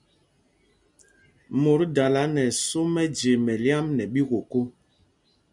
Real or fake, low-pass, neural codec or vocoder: real; 10.8 kHz; none